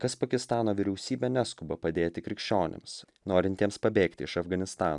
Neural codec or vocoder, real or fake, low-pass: none; real; 10.8 kHz